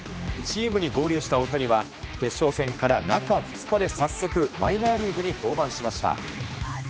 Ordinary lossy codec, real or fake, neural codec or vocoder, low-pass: none; fake; codec, 16 kHz, 2 kbps, X-Codec, HuBERT features, trained on general audio; none